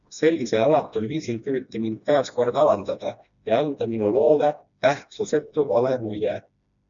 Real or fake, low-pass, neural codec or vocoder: fake; 7.2 kHz; codec, 16 kHz, 1 kbps, FreqCodec, smaller model